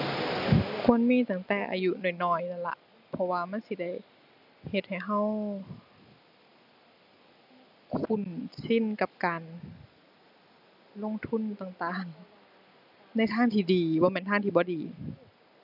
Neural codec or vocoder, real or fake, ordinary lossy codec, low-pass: none; real; none; 5.4 kHz